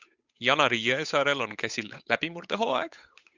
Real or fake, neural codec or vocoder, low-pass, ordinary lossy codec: fake; codec, 16 kHz, 8 kbps, FunCodec, trained on Chinese and English, 25 frames a second; 7.2 kHz; Opus, 64 kbps